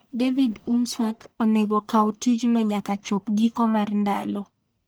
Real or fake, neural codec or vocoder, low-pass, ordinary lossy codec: fake; codec, 44.1 kHz, 1.7 kbps, Pupu-Codec; none; none